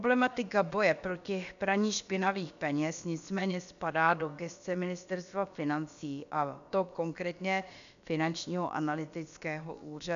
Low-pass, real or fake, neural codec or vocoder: 7.2 kHz; fake; codec, 16 kHz, about 1 kbps, DyCAST, with the encoder's durations